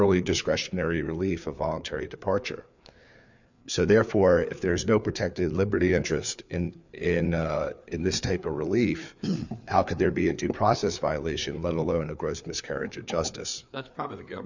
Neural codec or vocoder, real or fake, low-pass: codec, 16 kHz, 4 kbps, FreqCodec, larger model; fake; 7.2 kHz